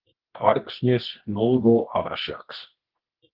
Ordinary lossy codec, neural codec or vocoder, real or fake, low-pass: Opus, 16 kbps; codec, 24 kHz, 0.9 kbps, WavTokenizer, medium music audio release; fake; 5.4 kHz